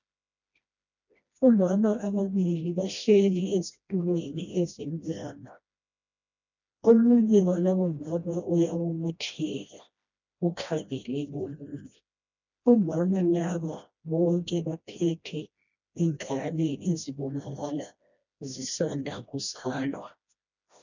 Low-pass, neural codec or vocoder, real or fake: 7.2 kHz; codec, 16 kHz, 1 kbps, FreqCodec, smaller model; fake